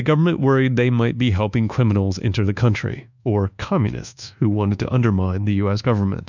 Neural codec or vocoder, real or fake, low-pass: codec, 24 kHz, 1.2 kbps, DualCodec; fake; 7.2 kHz